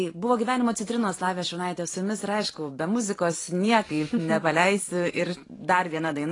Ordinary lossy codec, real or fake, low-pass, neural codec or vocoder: AAC, 32 kbps; fake; 10.8 kHz; vocoder, 44.1 kHz, 128 mel bands every 512 samples, BigVGAN v2